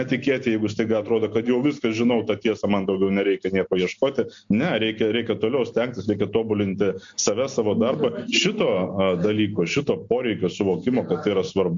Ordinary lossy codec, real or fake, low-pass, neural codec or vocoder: MP3, 48 kbps; real; 7.2 kHz; none